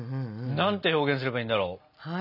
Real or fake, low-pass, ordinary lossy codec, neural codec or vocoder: real; 5.4 kHz; none; none